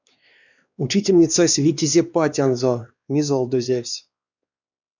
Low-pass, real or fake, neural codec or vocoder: 7.2 kHz; fake; codec, 16 kHz, 2 kbps, X-Codec, WavLM features, trained on Multilingual LibriSpeech